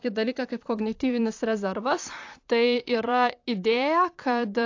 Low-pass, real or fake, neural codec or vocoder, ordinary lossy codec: 7.2 kHz; fake; vocoder, 44.1 kHz, 128 mel bands, Pupu-Vocoder; AAC, 48 kbps